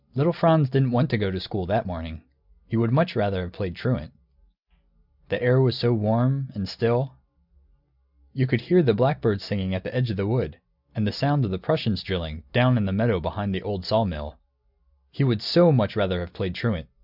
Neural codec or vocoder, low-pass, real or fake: none; 5.4 kHz; real